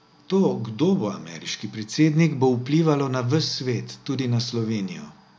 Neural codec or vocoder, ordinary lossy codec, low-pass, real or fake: none; none; none; real